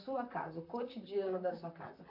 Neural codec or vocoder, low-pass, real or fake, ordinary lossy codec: vocoder, 44.1 kHz, 128 mel bands, Pupu-Vocoder; 5.4 kHz; fake; AAC, 48 kbps